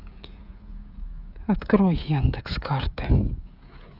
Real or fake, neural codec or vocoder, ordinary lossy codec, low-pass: fake; codec, 16 kHz, 16 kbps, FreqCodec, smaller model; none; 5.4 kHz